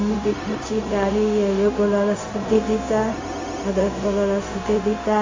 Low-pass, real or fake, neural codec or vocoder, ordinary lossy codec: 7.2 kHz; fake; codec, 16 kHz, 0.4 kbps, LongCat-Audio-Codec; AAC, 32 kbps